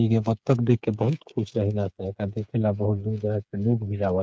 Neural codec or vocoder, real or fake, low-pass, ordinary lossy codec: codec, 16 kHz, 8 kbps, FreqCodec, smaller model; fake; none; none